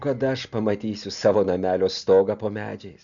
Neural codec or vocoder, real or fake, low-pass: none; real; 7.2 kHz